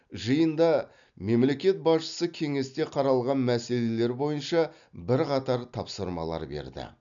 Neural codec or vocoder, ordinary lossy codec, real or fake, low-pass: none; none; real; 7.2 kHz